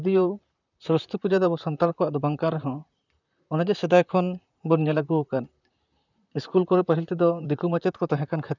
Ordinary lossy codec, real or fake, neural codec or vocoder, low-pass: none; fake; vocoder, 44.1 kHz, 128 mel bands, Pupu-Vocoder; 7.2 kHz